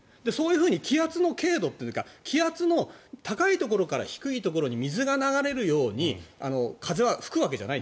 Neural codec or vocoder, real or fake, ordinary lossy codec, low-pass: none; real; none; none